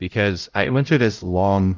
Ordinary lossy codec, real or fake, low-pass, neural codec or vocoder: Opus, 32 kbps; fake; 7.2 kHz; codec, 16 kHz, 0.5 kbps, X-Codec, HuBERT features, trained on LibriSpeech